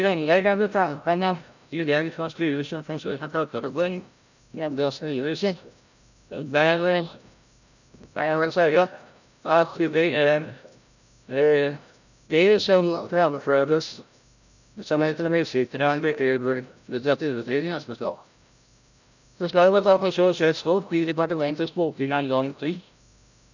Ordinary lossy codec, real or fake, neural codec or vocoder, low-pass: none; fake; codec, 16 kHz, 0.5 kbps, FreqCodec, larger model; 7.2 kHz